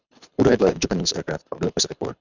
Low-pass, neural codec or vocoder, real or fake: 7.2 kHz; codec, 24 kHz, 6 kbps, HILCodec; fake